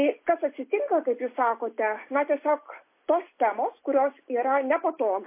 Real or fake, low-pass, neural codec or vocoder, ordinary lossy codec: real; 3.6 kHz; none; MP3, 24 kbps